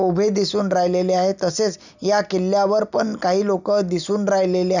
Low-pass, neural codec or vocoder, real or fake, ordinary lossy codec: 7.2 kHz; none; real; none